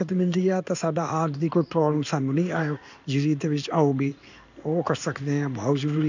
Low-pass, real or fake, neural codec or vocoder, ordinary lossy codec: 7.2 kHz; fake; codec, 16 kHz in and 24 kHz out, 1 kbps, XY-Tokenizer; none